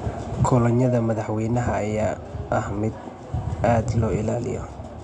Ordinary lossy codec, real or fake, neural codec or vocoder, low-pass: Opus, 64 kbps; real; none; 10.8 kHz